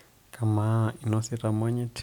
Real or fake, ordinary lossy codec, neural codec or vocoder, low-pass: real; none; none; 19.8 kHz